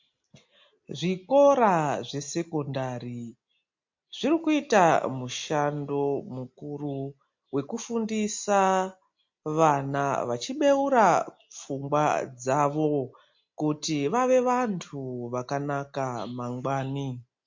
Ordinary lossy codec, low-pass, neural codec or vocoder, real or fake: MP3, 48 kbps; 7.2 kHz; none; real